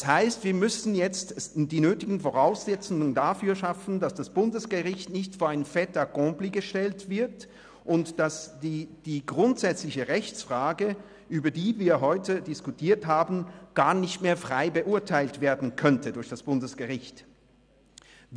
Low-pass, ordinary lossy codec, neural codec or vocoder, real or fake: 9.9 kHz; none; none; real